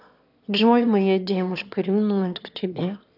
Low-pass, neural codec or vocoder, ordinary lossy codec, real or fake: 5.4 kHz; autoencoder, 22.05 kHz, a latent of 192 numbers a frame, VITS, trained on one speaker; AAC, 48 kbps; fake